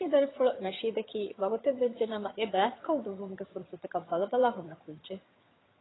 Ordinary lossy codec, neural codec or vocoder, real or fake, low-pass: AAC, 16 kbps; vocoder, 22.05 kHz, 80 mel bands, HiFi-GAN; fake; 7.2 kHz